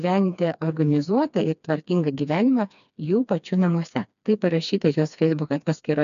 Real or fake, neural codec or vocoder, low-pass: fake; codec, 16 kHz, 2 kbps, FreqCodec, smaller model; 7.2 kHz